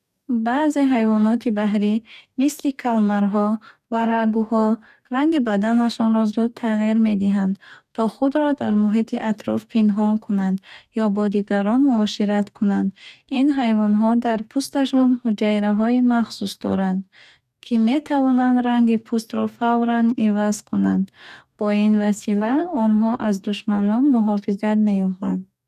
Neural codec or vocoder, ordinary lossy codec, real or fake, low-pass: codec, 44.1 kHz, 2.6 kbps, DAC; none; fake; 14.4 kHz